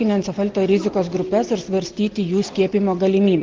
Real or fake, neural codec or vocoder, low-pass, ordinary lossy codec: real; none; 7.2 kHz; Opus, 16 kbps